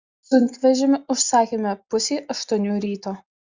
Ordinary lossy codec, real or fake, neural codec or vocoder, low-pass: Opus, 64 kbps; real; none; 7.2 kHz